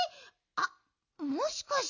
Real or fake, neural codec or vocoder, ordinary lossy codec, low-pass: real; none; none; 7.2 kHz